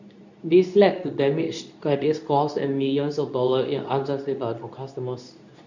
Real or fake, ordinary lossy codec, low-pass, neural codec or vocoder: fake; none; 7.2 kHz; codec, 24 kHz, 0.9 kbps, WavTokenizer, medium speech release version 2